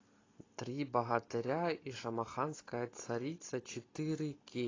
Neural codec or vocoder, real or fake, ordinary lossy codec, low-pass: none; real; AAC, 32 kbps; 7.2 kHz